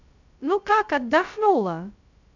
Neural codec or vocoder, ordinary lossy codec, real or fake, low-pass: codec, 16 kHz, 0.2 kbps, FocalCodec; none; fake; 7.2 kHz